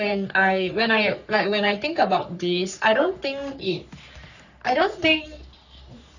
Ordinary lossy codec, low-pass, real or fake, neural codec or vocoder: none; 7.2 kHz; fake; codec, 44.1 kHz, 3.4 kbps, Pupu-Codec